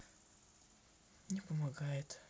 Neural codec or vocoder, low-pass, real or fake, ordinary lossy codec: none; none; real; none